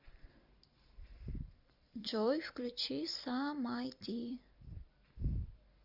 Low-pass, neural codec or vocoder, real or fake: 5.4 kHz; vocoder, 44.1 kHz, 128 mel bands every 256 samples, BigVGAN v2; fake